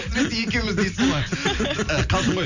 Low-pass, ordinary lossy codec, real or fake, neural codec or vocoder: 7.2 kHz; none; real; none